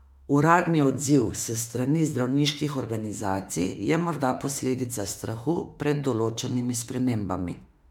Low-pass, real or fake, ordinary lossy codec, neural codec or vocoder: 19.8 kHz; fake; MP3, 96 kbps; autoencoder, 48 kHz, 32 numbers a frame, DAC-VAE, trained on Japanese speech